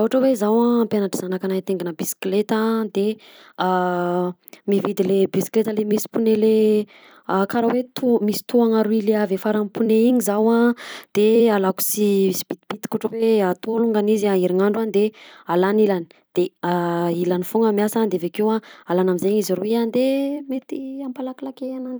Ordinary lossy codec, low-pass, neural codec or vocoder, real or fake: none; none; vocoder, 44.1 kHz, 128 mel bands every 256 samples, BigVGAN v2; fake